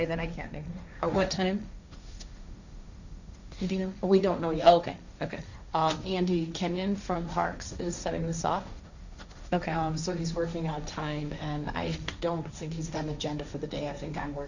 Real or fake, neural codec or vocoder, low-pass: fake; codec, 16 kHz, 1.1 kbps, Voila-Tokenizer; 7.2 kHz